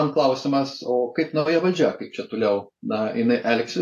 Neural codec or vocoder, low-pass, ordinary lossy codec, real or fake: none; 14.4 kHz; AAC, 64 kbps; real